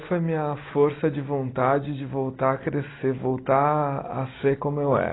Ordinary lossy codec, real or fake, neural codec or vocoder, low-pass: AAC, 16 kbps; real; none; 7.2 kHz